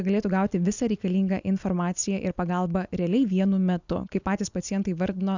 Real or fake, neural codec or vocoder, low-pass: real; none; 7.2 kHz